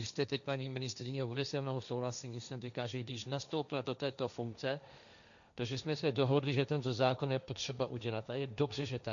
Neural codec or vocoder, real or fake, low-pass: codec, 16 kHz, 1.1 kbps, Voila-Tokenizer; fake; 7.2 kHz